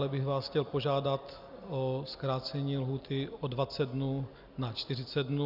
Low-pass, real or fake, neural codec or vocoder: 5.4 kHz; real; none